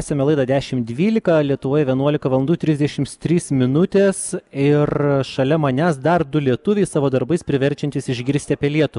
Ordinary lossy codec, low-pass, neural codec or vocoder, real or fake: MP3, 96 kbps; 10.8 kHz; none; real